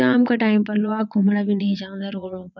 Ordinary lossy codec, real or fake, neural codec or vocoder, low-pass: none; fake; vocoder, 22.05 kHz, 80 mel bands, Vocos; 7.2 kHz